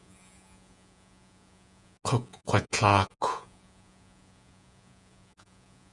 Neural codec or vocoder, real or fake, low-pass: vocoder, 48 kHz, 128 mel bands, Vocos; fake; 10.8 kHz